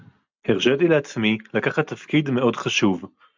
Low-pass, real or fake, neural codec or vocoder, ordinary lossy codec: 7.2 kHz; real; none; MP3, 64 kbps